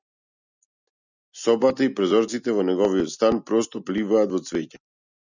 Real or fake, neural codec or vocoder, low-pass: real; none; 7.2 kHz